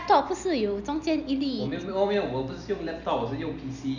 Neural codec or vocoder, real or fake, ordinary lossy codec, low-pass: none; real; none; 7.2 kHz